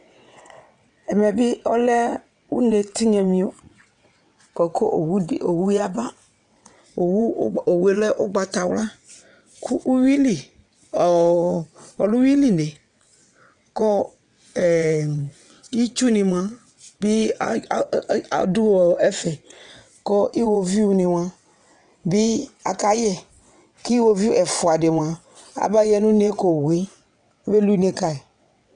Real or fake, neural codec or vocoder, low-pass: fake; vocoder, 22.05 kHz, 80 mel bands, WaveNeXt; 9.9 kHz